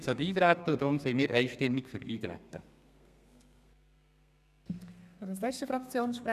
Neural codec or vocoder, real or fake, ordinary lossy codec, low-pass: codec, 32 kHz, 1.9 kbps, SNAC; fake; none; 14.4 kHz